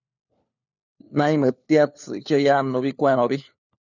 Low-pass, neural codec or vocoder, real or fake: 7.2 kHz; codec, 16 kHz, 4 kbps, FunCodec, trained on LibriTTS, 50 frames a second; fake